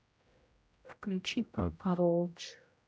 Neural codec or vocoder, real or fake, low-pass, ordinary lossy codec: codec, 16 kHz, 0.5 kbps, X-Codec, HuBERT features, trained on general audio; fake; none; none